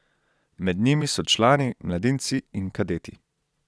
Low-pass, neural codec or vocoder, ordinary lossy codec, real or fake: none; vocoder, 22.05 kHz, 80 mel bands, Vocos; none; fake